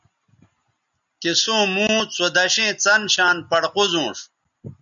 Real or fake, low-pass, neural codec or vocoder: real; 7.2 kHz; none